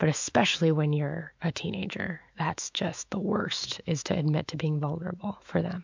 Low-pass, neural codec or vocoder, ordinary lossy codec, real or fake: 7.2 kHz; autoencoder, 48 kHz, 128 numbers a frame, DAC-VAE, trained on Japanese speech; MP3, 64 kbps; fake